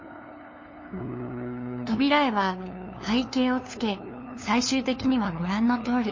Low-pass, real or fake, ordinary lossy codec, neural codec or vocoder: 7.2 kHz; fake; MP3, 32 kbps; codec, 16 kHz, 2 kbps, FunCodec, trained on LibriTTS, 25 frames a second